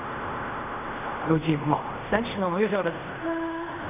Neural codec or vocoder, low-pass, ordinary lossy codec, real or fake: codec, 16 kHz in and 24 kHz out, 0.4 kbps, LongCat-Audio-Codec, fine tuned four codebook decoder; 3.6 kHz; none; fake